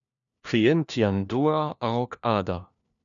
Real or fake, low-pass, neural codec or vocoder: fake; 7.2 kHz; codec, 16 kHz, 1 kbps, FunCodec, trained on LibriTTS, 50 frames a second